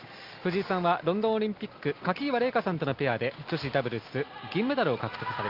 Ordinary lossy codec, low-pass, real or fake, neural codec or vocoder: Opus, 32 kbps; 5.4 kHz; real; none